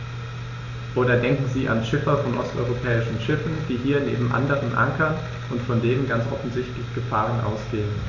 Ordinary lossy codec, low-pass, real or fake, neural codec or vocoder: AAC, 48 kbps; 7.2 kHz; real; none